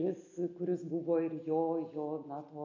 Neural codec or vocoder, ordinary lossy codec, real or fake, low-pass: none; AAC, 48 kbps; real; 7.2 kHz